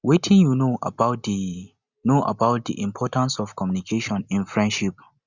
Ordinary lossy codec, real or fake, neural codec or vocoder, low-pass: Opus, 64 kbps; real; none; 7.2 kHz